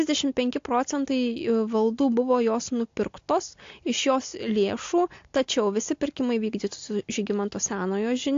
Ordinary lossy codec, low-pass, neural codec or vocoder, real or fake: AAC, 48 kbps; 7.2 kHz; none; real